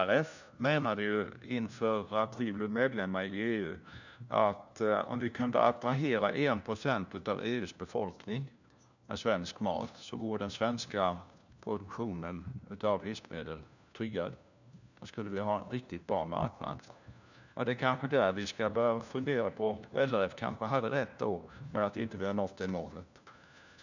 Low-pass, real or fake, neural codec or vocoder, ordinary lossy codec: 7.2 kHz; fake; codec, 16 kHz, 1 kbps, FunCodec, trained on LibriTTS, 50 frames a second; none